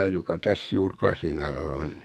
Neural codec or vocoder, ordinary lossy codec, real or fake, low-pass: codec, 44.1 kHz, 2.6 kbps, SNAC; none; fake; 14.4 kHz